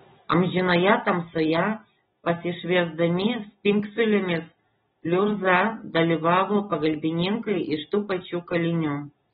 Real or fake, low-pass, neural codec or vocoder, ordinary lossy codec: real; 19.8 kHz; none; AAC, 16 kbps